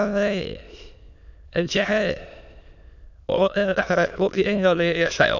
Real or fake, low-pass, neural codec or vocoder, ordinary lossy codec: fake; 7.2 kHz; autoencoder, 22.05 kHz, a latent of 192 numbers a frame, VITS, trained on many speakers; none